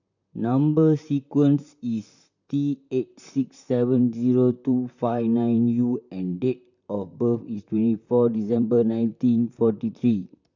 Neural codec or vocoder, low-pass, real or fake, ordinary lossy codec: vocoder, 44.1 kHz, 128 mel bands, Pupu-Vocoder; 7.2 kHz; fake; none